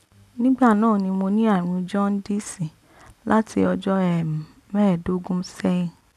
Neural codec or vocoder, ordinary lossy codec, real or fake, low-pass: none; none; real; 14.4 kHz